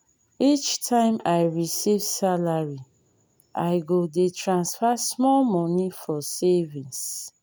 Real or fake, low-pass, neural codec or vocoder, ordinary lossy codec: real; none; none; none